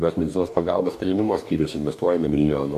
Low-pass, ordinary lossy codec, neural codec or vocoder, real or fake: 14.4 kHz; MP3, 96 kbps; codec, 44.1 kHz, 2.6 kbps, DAC; fake